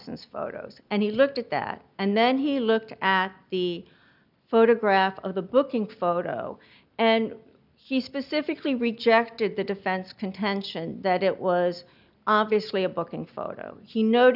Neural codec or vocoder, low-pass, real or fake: none; 5.4 kHz; real